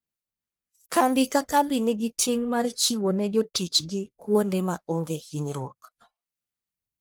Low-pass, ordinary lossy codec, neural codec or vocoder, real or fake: none; none; codec, 44.1 kHz, 1.7 kbps, Pupu-Codec; fake